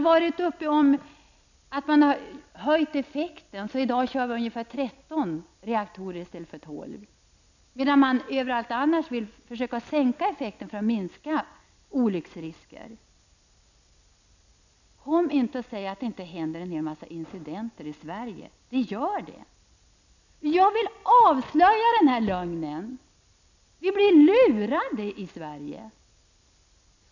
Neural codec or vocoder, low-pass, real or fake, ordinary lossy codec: none; 7.2 kHz; real; none